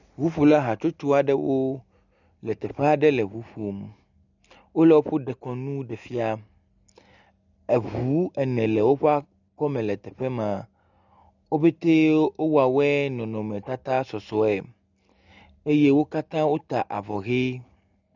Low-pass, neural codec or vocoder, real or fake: 7.2 kHz; none; real